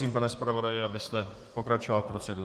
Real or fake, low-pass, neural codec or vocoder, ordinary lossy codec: fake; 14.4 kHz; codec, 32 kHz, 1.9 kbps, SNAC; Opus, 32 kbps